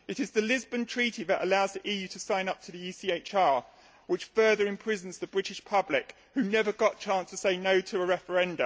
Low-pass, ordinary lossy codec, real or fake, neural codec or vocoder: none; none; real; none